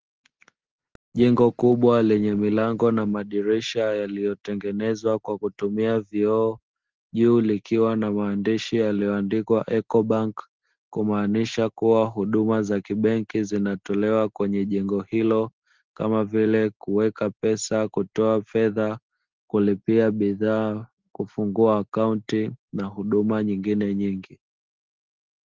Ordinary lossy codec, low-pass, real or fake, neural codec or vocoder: Opus, 16 kbps; 7.2 kHz; real; none